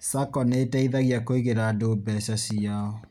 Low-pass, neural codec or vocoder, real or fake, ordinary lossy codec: 19.8 kHz; none; real; none